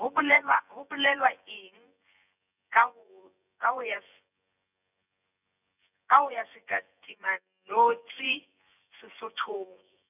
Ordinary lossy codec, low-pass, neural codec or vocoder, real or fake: none; 3.6 kHz; vocoder, 24 kHz, 100 mel bands, Vocos; fake